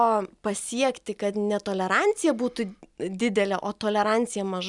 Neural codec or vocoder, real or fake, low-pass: none; real; 10.8 kHz